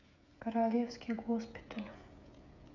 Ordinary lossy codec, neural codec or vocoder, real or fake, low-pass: none; codec, 16 kHz, 16 kbps, FreqCodec, smaller model; fake; 7.2 kHz